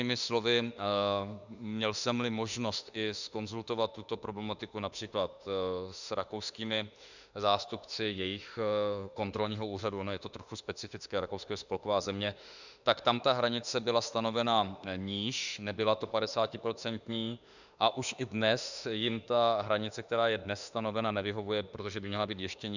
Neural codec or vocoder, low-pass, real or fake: autoencoder, 48 kHz, 32 numbers a frame, DAC-VAE, trained on Japanese speech; 7.2 kHz; fake